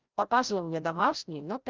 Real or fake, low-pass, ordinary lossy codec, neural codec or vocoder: fake; 7.2 kHz; Opus, 24 kbps; codec, 16 kHz, 0.5 kbps, FreqCodec, larger model